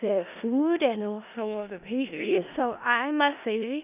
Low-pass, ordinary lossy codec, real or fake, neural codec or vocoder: 3.6 kHz; none; fake; codec, 16 kHz in and 24 kHz out, 0.4 kbps, LongCat-Audio-Codec, four codebook decoder